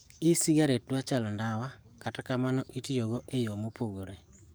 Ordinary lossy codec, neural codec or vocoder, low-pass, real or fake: none; codec, 44.1 kHz, 7.8 kbps, DAC; none; fake